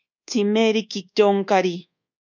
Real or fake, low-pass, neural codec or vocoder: fake; 7.2 kHz; codec, 24 kHz, 1.2 kbps, DualCodec